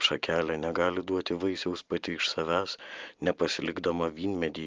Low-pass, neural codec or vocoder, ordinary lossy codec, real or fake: 7.2 kHz; none; Opus, 24 kbps; real